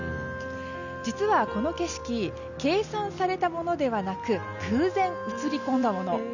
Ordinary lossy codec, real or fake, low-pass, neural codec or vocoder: none; real; 7.2 kHz; none